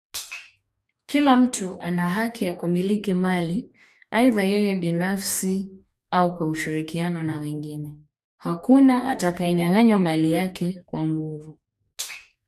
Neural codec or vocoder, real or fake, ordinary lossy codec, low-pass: codec, 44.1 kHz, 2.6 kbps, DAC; fake; none; 14.4 kHz